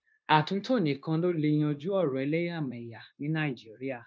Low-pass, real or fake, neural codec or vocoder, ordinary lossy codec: none; fake; codec, 16 kHz, 0.9 kbps, LongCat-Audio-Codec; none